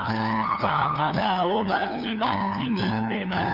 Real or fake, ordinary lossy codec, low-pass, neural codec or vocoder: fake; none; 5.4 kHz; codec, 16 kHz, 2 kbps, FunCodec, trained on LibriTTS, 25 frames a second